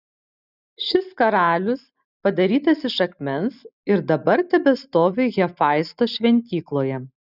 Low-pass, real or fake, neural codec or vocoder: 5.4 kHz; real; none